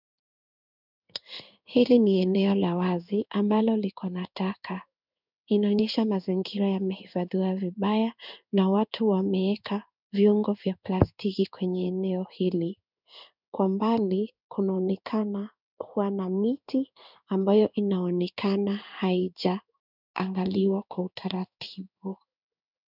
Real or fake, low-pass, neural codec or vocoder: fake; 5.4 kHz; codec, 16 kHz in and 24 kHz out, 1 kbps, XY-Tokenizer